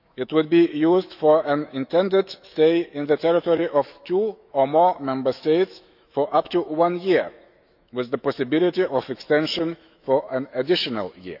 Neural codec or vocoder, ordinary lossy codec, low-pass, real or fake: autoencoder, 48 kHz, 128 numbers a frame, DAC-VAE, trained on Japanese speech; none; 5.4 kHz; fake